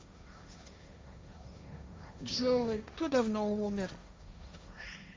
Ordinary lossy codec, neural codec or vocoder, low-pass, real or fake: none; codec, 16 kHz, 1.1 kbps, Voila-Tokenizer; none; fake